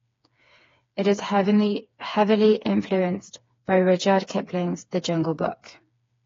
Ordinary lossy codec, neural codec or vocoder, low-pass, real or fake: AAC, 32 kbps; codec, 16 kHz, 4 kbps, FreqCodec, smaller model; 7.2 kHz; fake